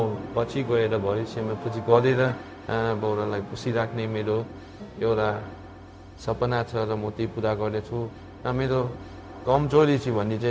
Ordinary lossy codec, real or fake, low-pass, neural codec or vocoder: none; fake; none; codec, 16 kHz, 0.4 kbps, LongCat-Audio-Codec